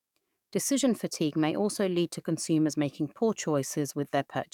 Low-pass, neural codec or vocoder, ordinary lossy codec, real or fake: 19.8 kHz; codec, 44.1 kHz, 7.8 kbps, DAC; none; fake